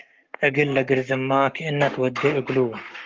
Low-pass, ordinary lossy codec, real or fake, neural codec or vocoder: 7.2 kHz; Opus, 32 kbps; fake; codec, 44.1 kHz, 7.8 kbps, Pupu-Codec